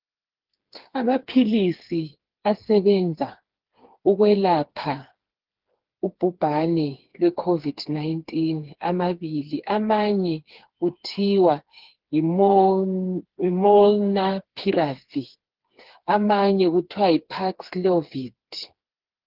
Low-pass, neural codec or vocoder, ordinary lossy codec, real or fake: 5.4 kHz; codec, 16 kHz, 4 kbps, FreqCodec, smaller model; Opus, 16 kbps; fake